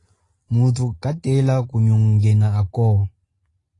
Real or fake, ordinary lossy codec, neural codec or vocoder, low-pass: real; AAC, 32 kbps; none; 10.8 kHz